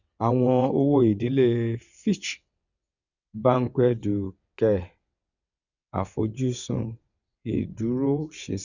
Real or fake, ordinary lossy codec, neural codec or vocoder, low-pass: fake; none; vocoder, 22.05 kHz, 80 mel bands, WaveNeXt; 7.2 kHz